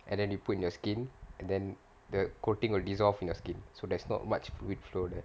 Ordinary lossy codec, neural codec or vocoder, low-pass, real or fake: none; none; none; real